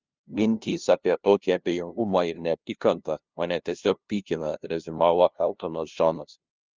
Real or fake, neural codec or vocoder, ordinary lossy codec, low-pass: fake; codec, 16 kHz, 0.5 kbps, FunCodec, trained on LibriTTS, 25 frames a second; Opus, 24 kbps; 7.2 kHz